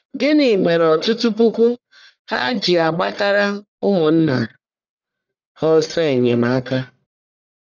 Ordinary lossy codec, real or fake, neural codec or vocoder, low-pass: none; fake; codec, 44.1 kHz, 1.7 kbps, Pupu-Codec; 7.2 kHz